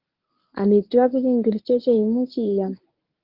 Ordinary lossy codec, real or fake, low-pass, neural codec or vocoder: Opus, 32 kbps; fake; 5.4 kHz; codec, 24 kHz, 0.9 kbps, WavTokenizer, medium speech release version 1